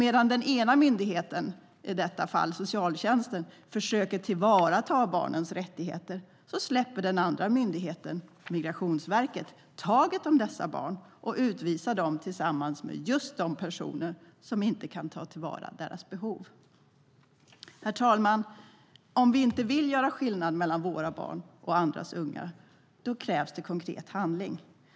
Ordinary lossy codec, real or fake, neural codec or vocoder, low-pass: none; real; none; none